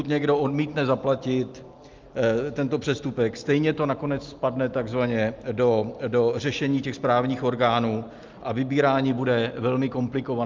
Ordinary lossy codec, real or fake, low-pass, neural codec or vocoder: Opus, 24 kbps; real; 7.2 kHz; none